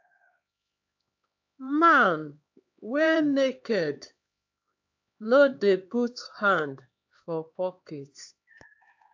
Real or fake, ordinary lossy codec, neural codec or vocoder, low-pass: fake; AAC, 48 kbps; codec, 16 kHz, 2 kbps, X-Codec, HuBERT features, trained on LibriSpeech; 7.2 kHz